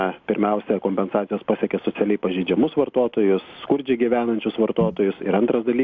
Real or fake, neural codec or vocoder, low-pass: real; none; 7.2 kHz